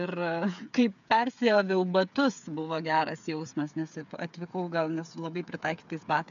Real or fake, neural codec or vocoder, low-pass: fake; codec, 16 kHz, 8 kbps, FreqCodec, smaller model; 7.2 kHz